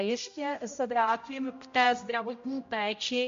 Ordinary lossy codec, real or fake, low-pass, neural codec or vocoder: MP3, 48 kbps; fake; 7.2 kHz; codec, 16 kHz, 0.5 kbps, X-Codec, HuBERT features, trained on general audio